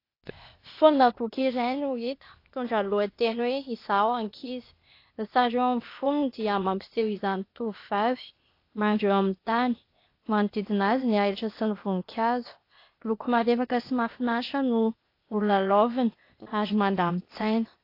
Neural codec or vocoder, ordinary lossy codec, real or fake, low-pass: codec, 16 kHz, 0.8 kbps, ZipCodec; AAC, 32 kbps; fake; 5.4 kHz